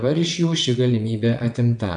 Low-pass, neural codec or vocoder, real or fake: 9.9 kHz; vocoder, 22.05 kHz, 80 mel bands, WaveNeXt; fake